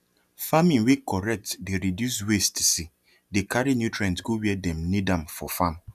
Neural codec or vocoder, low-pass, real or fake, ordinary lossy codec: none; 14.4 kHz; real; none